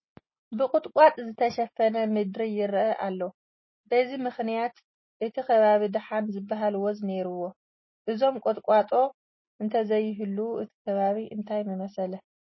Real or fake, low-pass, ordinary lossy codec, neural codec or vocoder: real; 7.2 kHz; MP3, 24 kbps; none